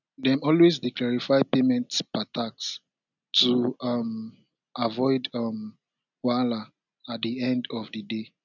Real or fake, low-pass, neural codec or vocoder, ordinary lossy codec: real; 7.2 kHz; none; none